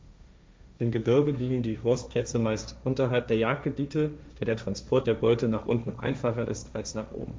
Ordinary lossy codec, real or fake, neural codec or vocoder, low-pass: none; fake; codec, 16 kHz, 1.1 kbps, Voila-Tokenizer; none